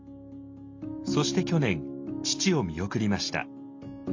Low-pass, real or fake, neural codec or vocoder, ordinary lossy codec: 7.2 kHz; real; none; MP3, 48 kbps